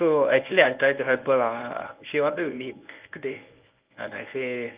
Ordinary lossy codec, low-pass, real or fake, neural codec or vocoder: Opus, 24 kbps; 3.6 kHz; fake; codec, 24 kHz, 0.9 kbps, WavTokenizer, medium speech release version 1